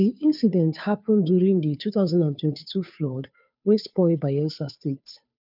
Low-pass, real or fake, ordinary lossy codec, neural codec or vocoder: 5.4 kHz; fake; none; codec, 16 kHz, 2 kbps, FunCodec, trained on Chinese and English, 25 frames a second